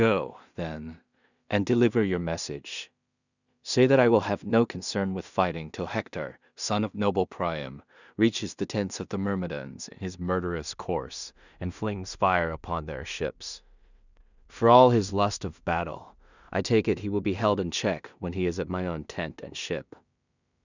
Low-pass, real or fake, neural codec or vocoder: 7.2 kHz; fake; codec, 16 kHz in and 24 kHz out, 0.4 kbps, LongCat-Audio-Codec, two codebook decoder